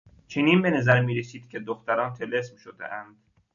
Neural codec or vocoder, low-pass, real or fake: none; 7.2 kHz; real